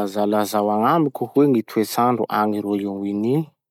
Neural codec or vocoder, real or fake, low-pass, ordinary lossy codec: none; real; 19.8 kHz; none